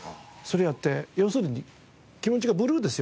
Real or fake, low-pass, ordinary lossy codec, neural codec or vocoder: real; none; none; none